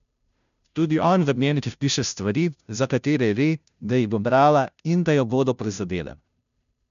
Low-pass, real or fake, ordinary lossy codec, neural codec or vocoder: 7.2 kHz; fake; none; codec, 16 kHz, 0.5 kbps, FunCodec, trained on Chinese and English, 25 frames a second